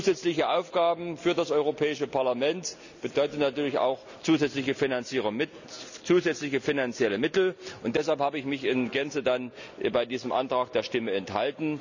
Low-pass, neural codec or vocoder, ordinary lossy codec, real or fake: 7.2 kHz; none; none; real